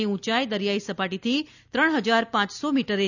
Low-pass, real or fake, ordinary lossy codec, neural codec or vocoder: 7.2 kHz; real; none; none